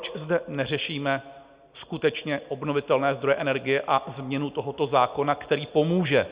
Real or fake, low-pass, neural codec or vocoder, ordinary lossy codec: real; 3.6 kHz; none; Opus, 64 kbps